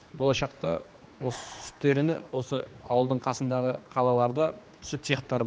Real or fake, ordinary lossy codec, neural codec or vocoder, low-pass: fake; none; codec, 16 kHz, 2 kbps, X-Codec, HuBERT features, trained on general audio; none